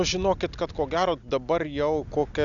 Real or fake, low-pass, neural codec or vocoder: real; 7.2 kHz; none